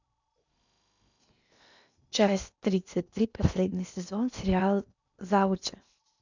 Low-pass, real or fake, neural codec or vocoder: 7.2 kHz; fake; codec, 16 kHz in and 24 kHz out, 0.8 kbps, FocalCodec, streaming, 65536 codes